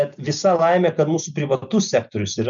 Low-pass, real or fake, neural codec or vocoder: 7.2 kHz; real; none